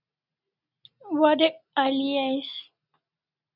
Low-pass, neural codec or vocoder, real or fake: 5.4 kHz; none; real